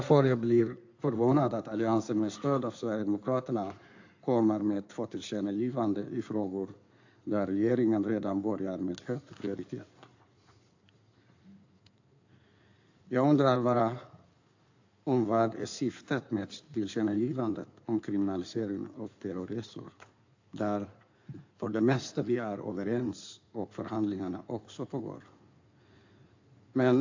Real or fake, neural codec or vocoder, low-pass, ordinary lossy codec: fake; codec, 16 kHz in and 24 kHz out, 2.2 kbps, FireRedTTS-2 codec; 7.2 kHz; AAC, 48 kbps